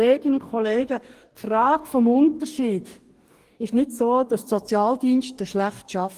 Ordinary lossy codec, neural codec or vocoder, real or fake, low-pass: Opus, 24 kbps; codec, 44.1 kHz, 2.6 kbps, DAC; fake; 14.4 kHz